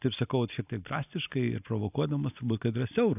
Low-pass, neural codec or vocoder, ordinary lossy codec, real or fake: 3.6 kHz; none; AAC, 32 kbps; real